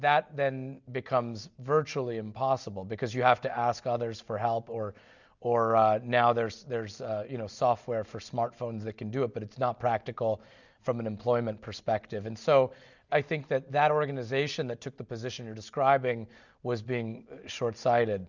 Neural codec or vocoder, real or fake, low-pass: none; real; 7.2 kHz